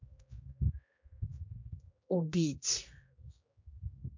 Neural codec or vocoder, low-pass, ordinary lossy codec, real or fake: codec, 16 kHz, 1 kbps, X-Codec, HuBERT features, trained on general audio; 7.2 kHz; MP3, 64 kbps; fake